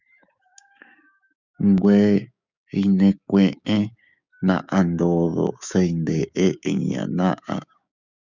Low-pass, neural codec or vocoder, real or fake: 7.2 kHz; codec, 16 kHz, 6 kbps, DAC; fake